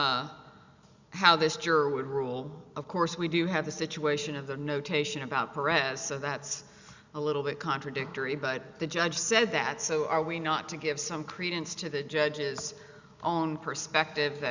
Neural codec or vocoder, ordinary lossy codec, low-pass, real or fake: none; Opus, 64 kbps; 7.2 kHz; real